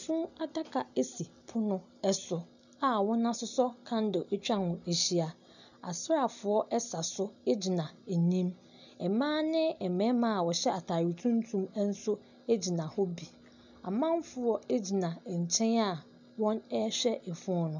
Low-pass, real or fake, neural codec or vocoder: 7.2 kHz; real; none